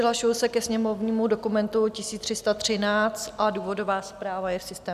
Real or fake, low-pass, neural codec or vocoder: real; 14.4 kHz; none